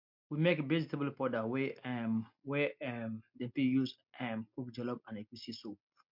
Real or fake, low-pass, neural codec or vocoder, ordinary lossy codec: real; 5.4 kHz; none; MP3, 48 kbps